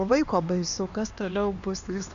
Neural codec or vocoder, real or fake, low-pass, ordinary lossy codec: codec, 16 kHz, 2 kbps, X-Codec, HuBERT features, trained on LibriSpeech; fake; 7.2 kHz; MP3, 64 kbps